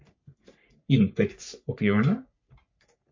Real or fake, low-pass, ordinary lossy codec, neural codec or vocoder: fake; 7.2 kHz; MP3, 48 kbps; codec, 44.1 kHz, 3.4 kbps, Pupu-Codec